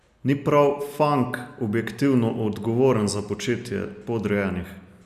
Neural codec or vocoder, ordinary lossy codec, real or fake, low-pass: none; none; real; 14.4 kHz